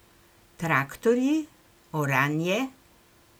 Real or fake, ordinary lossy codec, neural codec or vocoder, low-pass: real; none; none; none